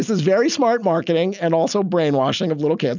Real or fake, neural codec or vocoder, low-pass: real; none; 7.2 kHz